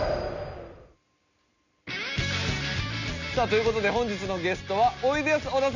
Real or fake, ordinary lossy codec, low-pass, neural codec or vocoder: real; none; 7.2 kHz; none